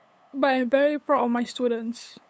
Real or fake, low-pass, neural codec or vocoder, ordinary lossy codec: fake; none; codec, 16 kHz, 8 kbps, FunCodec, trained on LibriTTS, 25 frames a second; none